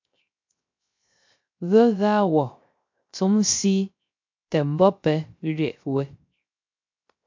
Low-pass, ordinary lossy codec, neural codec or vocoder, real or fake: 7.2 kHz; MP3, 48 kbps; codec, 16 kHz, 0.3 kbps, FocalCodec; fake